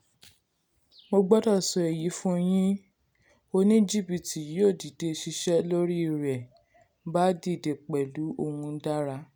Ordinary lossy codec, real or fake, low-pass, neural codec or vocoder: none; real; none; none